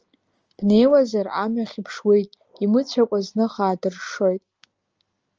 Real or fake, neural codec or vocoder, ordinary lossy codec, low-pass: real; none; Opus, 24 kbps; 7.2 kHz